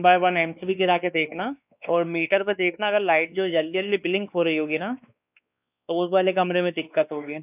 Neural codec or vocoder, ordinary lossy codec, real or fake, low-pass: codec, 16 kHz, 2 kbps, X-Codec, WavLM features, trained on Multilingual LibriSpeech; none; fake; 3.6 kHz